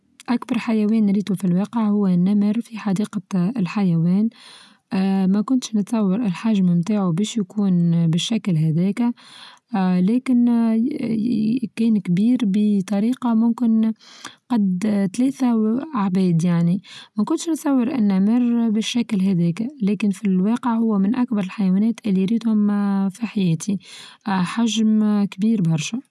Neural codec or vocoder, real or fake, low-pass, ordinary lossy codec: none; real; none; none